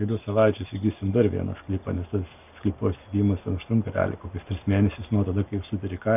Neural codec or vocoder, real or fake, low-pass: none; real; 3.6 kHz